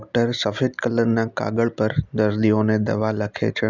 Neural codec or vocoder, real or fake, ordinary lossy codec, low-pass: none; real; none; 7.2 kHz